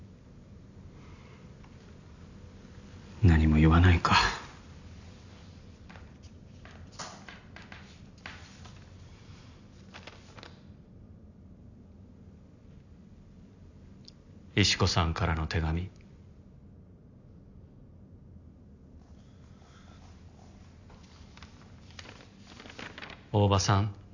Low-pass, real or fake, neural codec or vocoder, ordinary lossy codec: 7.2 kHz; real; none; none